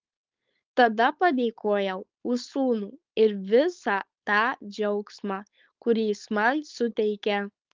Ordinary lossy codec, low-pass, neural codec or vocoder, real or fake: Opus, 24 kbps; 7.2 kHz; codec, 16 kHz, 4.8 kbps, FACodec; fake